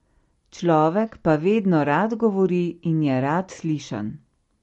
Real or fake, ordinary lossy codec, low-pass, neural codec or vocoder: real; MP3, 48 kbps; 19.8 kHz; none